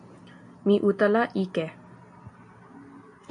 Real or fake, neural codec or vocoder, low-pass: real; none; 9.9 kHz